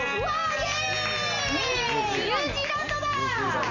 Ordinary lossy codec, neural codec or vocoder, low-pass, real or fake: none; none; 7.2 kHz; real